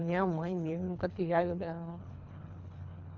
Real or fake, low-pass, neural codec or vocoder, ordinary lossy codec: fake; 7.2 kHz; codec, 24 kHz, 3 kbps, HILCodec; none